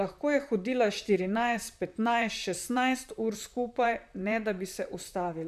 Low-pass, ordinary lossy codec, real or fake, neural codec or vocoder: 14.4 kHz; none; fake; vocoder, 44.1 kHz, 128 mel bands, Pupu-Vocoder